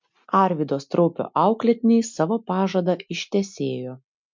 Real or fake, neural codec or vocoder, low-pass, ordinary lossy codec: real; none; 7.2 kHz; MP3, 64 kbps